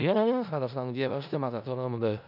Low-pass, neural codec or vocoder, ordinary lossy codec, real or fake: 5.4 kHz; codec, 16 kHz in and 24 kHz out, 0.4 kbps, LongCat-Audio-Codec, four codebook decoder; none; fake